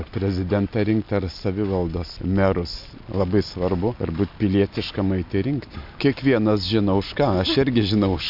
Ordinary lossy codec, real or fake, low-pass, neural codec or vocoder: MP3, 48 kbps; real; 5.4 kHz; none